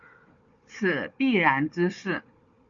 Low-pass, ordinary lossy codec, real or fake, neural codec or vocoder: 7.2 kHz; Opus, 64 kbps; fake; codec, 16 kHz, 4 kbps, FunCodec, trained on Chinese and English, 50 frames a second